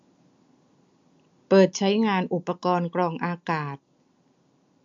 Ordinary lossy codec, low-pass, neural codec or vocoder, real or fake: AAC, 64 kbps; 7.2 kHz; none; real